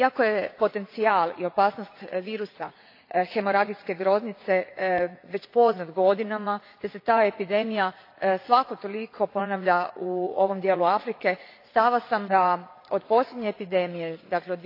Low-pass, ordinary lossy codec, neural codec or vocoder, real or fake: 5.4 kHz; AAC, 32 kbps; vocoder, 44.1 kHz, 80 mel bands, Vocos; fake